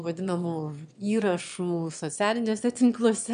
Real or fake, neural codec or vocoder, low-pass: fake; autoencoder, 22.05 kHz, a latent of 192 numbers a frame, VITS, trained on one speaker; 9.9 kHz